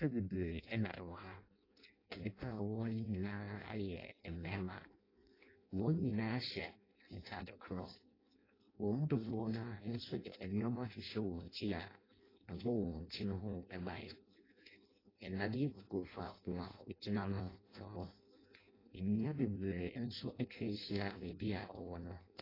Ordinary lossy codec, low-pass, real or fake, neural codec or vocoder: AAC, 24 kbps; 5.4 kHz; fake; codec, 16 kHz in and 24 kHz out, 0.6 kbps, FireRedTTS-2 codec